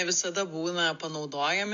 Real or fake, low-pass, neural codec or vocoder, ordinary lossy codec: real; 7.2 kHz; none; AAC, 64 kbps